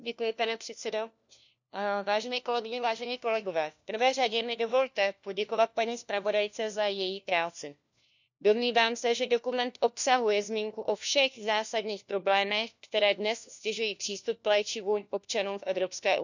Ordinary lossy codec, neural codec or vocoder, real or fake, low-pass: none; codec, 16 kHz, 1 kbps, FunCodec, trained on LibriTTS, 50 frames a second; fake; 7.2 kHz